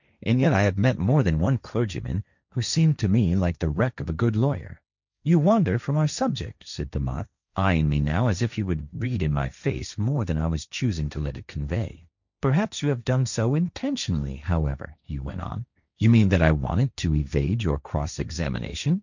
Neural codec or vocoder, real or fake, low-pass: codec, 16 kHz, 1.1 kbps, Voila-Tokenizer; fake; 7.2 kHz